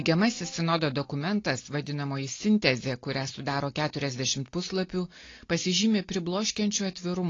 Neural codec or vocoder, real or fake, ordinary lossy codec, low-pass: none; real; AAC, 32 kbps; 7.2 kHz